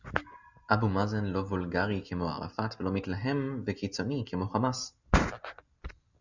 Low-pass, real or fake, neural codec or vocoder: 7.2 kHz; real; none